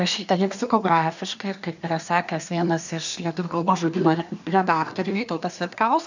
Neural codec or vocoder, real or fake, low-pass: codec, 24 kHz, 1 kbps, SNAC; fake; 7.2 kHz